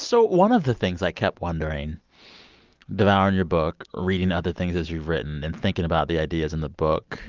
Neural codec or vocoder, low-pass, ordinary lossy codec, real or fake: none; 7.2 kHz; Opus, 24 kbps; real